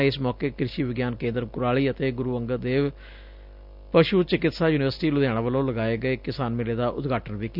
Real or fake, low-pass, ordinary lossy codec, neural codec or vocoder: real; 5.4 kHz; none; none